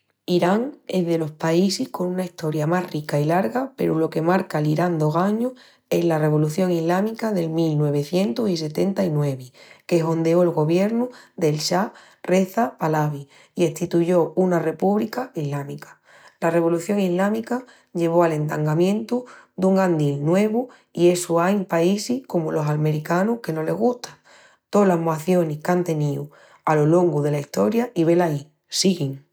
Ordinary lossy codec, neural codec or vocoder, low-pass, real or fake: none; none; none; real